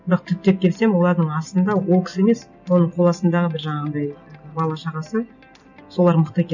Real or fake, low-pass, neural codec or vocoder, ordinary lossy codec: real; 7.2 kHz; none; none